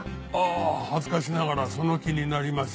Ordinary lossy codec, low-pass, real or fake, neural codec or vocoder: none; none; real; none